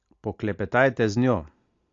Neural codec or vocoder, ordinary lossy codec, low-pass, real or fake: none; AAC, 48 kbps; 7.2 kHz; real